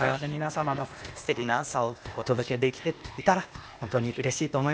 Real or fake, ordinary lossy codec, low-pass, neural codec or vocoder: fake; none; none; codec, 16 kHz, 0.8 kbps, ZipCodec